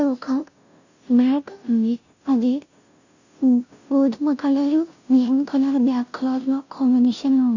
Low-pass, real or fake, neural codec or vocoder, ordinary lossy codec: 7.2 kHz; fake; codec, 16 kHz, 0.5 kbps, FunCodec, trained on Chinese and English, 25 frames a second; none